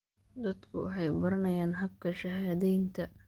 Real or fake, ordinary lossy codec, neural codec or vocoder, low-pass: fake; Opus, 24 kbps; codec, 44.1 kHz, 7.8 kbps, DAC; 19.8 kHz